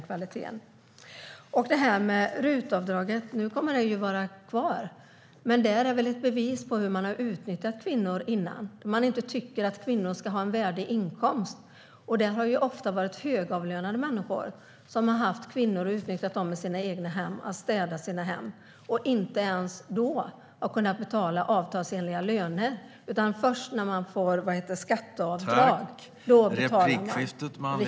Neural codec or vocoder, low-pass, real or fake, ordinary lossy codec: none; none; real; none